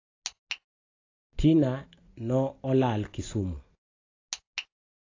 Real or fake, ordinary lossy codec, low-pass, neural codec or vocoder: real; AAC, 32 kbps; 7.2 kHz; none